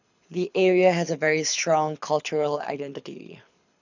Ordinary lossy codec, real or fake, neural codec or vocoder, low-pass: none; fake; codec, 24 kHz, 6 kbps, HILCodec; 7.2 kHz